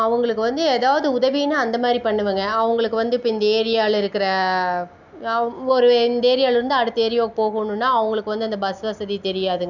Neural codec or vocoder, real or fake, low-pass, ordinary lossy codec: none; real; 7.2 kHz; none